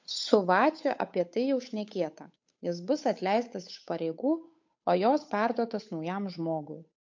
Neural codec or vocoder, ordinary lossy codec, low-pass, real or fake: codec, 16 kHz, 8 kbps, FunCodec, trained on Chinese and English, 25 frames a second; MP3, 48 kbps; 7.2 kHz; fake